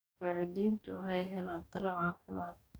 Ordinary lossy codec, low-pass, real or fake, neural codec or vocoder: none; none; fake; codec, 44.1 kHz, 2.6 kbps, DAC